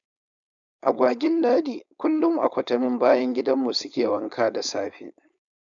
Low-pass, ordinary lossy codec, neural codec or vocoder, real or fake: 7.2 kHz; none; codec, 16 kHz, 4.8 kbps, FACodec; fake